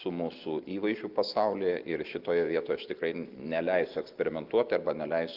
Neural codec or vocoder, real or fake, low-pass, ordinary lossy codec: none; real; 5.4 kHz; Opus, 24 kbps